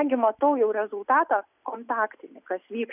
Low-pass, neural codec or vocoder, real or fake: 3.6 kHz; none; real